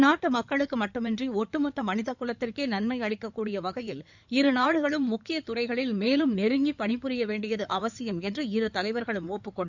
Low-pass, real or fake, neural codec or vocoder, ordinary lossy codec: 7.2 kHz; fake; codec, 16 kHz in and 24 kHz out, 2.2 kbps, FireRedTTS-2 codec; none